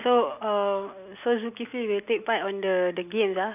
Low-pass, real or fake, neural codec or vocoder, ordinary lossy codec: 3.6 kHz; real; none; none